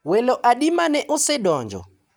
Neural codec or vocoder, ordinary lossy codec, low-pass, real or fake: none; none; none; real